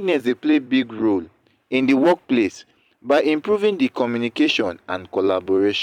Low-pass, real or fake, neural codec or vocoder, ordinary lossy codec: 19.8 kHz; fake; vocoder, 44.1 kHz, 128 mel bands every 256 samples, BigVGAN v2; none